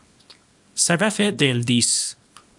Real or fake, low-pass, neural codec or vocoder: fake; 10.8 kHz; codec, 24 kHz, 0.9 kbps, WavTokenizer, small release